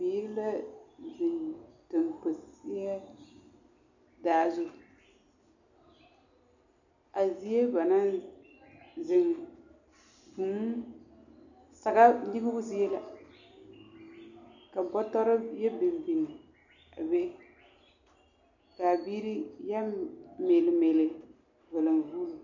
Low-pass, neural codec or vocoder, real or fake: 7.2 kHz; none; real